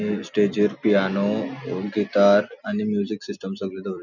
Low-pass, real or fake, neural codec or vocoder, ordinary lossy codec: 7.2 kHz; real; none; none